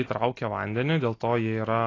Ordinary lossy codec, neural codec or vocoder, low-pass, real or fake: AAC, 32 kbps; none; 7.2 kHz; real